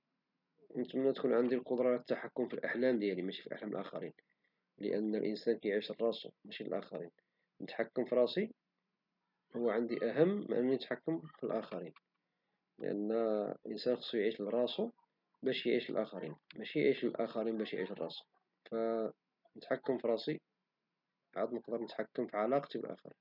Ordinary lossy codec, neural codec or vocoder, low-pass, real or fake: none; none; 5.4 kHz; real